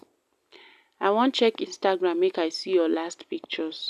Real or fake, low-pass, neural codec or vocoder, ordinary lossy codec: real; 14.4 kHz; none; none